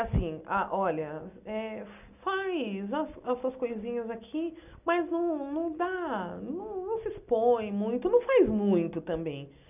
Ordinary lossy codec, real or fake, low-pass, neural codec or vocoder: none; real; 3.6 kHz; none